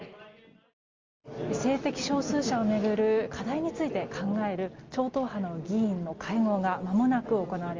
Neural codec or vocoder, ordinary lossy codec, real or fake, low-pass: none; Opus, 32 kbps; real; 7.2 kHz